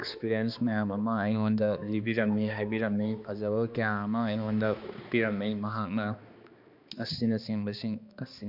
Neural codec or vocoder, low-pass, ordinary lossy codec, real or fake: codec, 16 kHz, 2 kbps, X-Codec, HuBERT features, trained on balanced general audio; 5.4 kHz; MP3, 48 kbps; fake